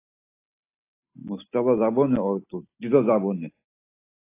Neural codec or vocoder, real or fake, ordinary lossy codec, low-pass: none; real; AAC, 24 kbps; 3.6 kHz